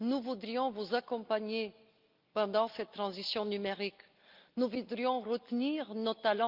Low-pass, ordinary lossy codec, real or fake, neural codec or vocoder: 5.4 kHz; Opus, 24 kbps; real; none